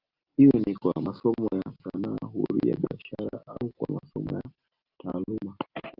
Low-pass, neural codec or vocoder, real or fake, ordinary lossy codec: 5.4 kHz; none; real; Opus, 24 kbps